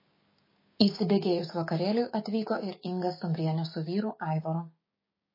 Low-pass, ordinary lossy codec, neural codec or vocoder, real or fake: 5.4 kHz; MP3, 24 kbps; codec, 16 kHz, 6 kbps, DAC; fake